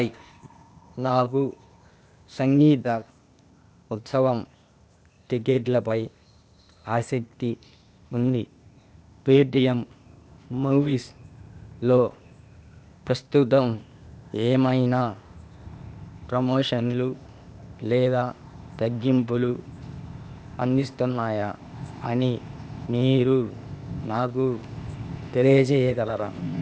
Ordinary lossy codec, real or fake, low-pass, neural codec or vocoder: none; fake; none; codec, 16 kHz, 0.8 kbps, ZipCodec